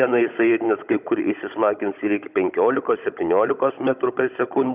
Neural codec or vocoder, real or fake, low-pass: codec, 16 kHz, 16 kbps, FunCodec, trained on Chinese and English, 50 frames a second; fake; 3.6 kHz